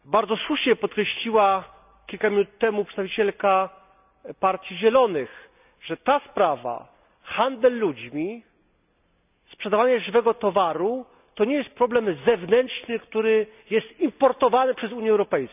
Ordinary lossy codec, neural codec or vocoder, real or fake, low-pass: none; none; real; 3.6 kHz